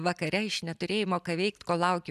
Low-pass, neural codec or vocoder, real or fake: 14.4 kHz; none; real